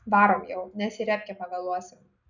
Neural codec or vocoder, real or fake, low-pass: none; real; 7.2 kHz